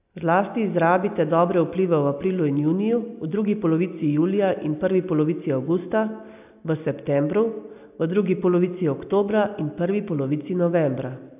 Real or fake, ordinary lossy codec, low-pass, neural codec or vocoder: real; AAC, 32 kbps; 3.6 kHz; none